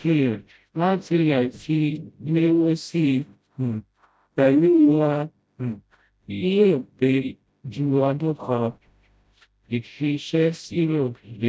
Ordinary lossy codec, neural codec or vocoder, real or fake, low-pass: none; codec, 16 kHz, 0.5 kbps, FreqCodec, smaller model; fake; none